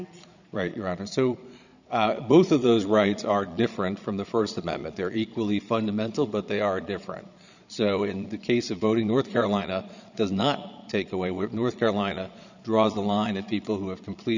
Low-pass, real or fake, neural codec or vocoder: 7.2 kHz; fake; vocoder, 22.05 kHz, 80 mel bands, Vocos